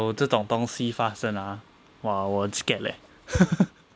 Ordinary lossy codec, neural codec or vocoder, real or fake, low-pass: none; none; real; none